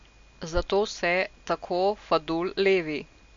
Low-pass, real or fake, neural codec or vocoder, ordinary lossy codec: 7.2 kHz; real; none; MP3, 48 kbps